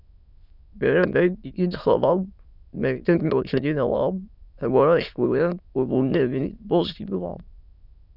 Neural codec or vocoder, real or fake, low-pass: autoencoder, 22.05 kHz, a latent of 192 numbers a frame, VITS, trained on many speakers; fake; 5.4 kHz